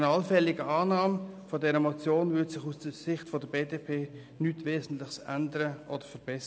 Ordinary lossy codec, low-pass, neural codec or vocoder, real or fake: none; none; none; real